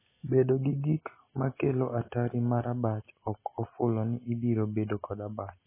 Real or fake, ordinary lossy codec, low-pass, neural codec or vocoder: real; MP3, 16 kbps; 3.6 kHz; none